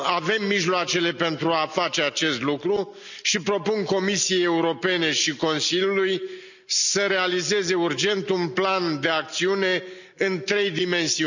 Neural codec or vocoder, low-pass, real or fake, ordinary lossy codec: none; 7.2 kHz; real; none